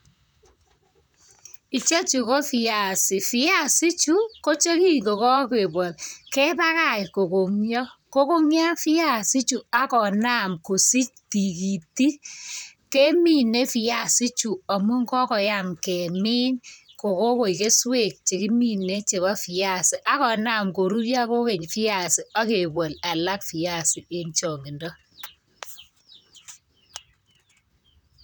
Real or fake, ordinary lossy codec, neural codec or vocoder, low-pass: fake; none; vocoder, 44.1 kHz, 128 mel bands, Pupu-Vocoder; none